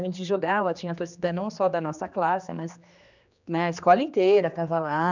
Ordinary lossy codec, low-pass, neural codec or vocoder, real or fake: none; 7.2 kHz; codec, 16 kHz, 2 kbps, X-Codec, HuBERT features, trained on general audio; fake